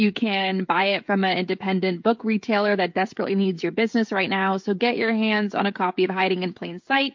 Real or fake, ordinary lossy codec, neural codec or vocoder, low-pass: fake; MP3, 48 kbps; codec, 16 kHz, 16 kbps, FreqCodec, smaller model; 7.2 kHz